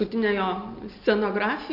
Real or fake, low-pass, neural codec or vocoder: real; 5.4 kHz; none